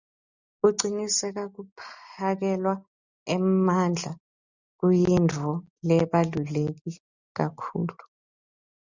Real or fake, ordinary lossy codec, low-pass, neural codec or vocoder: real; Opus, 64 kbps; 7.2 kHz; none